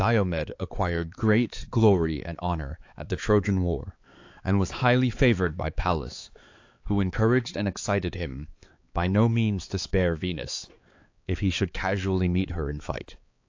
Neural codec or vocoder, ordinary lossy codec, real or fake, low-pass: codec, 16 kHz, 4 kbps, X-Codec, HuBERT features, trained on balanced general audio; AAC, 48 kbps; fake; 7.2 kHz